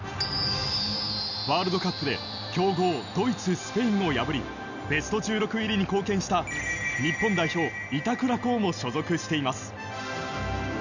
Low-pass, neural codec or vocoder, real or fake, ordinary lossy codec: 7.2 kHz; none; real; none